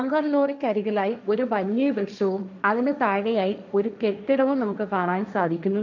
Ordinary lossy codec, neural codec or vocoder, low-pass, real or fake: none; codec, 16 kHz, 1.1 kbps, Voila-Tokenizer; 7.2 kHz; fake